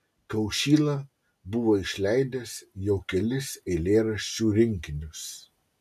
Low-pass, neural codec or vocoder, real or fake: 14.4 kHz; none; real